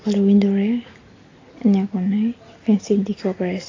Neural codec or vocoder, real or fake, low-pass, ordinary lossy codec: none; real; 7.2 kHz; AAC, 32 kbps